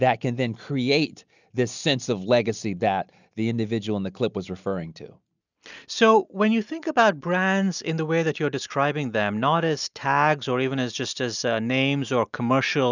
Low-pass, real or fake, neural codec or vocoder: 7.2 kHz; real; none